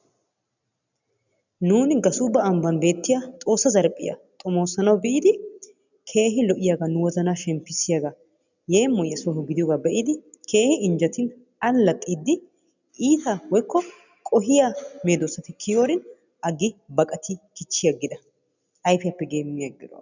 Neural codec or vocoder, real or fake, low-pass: none; real; 7.2 kHz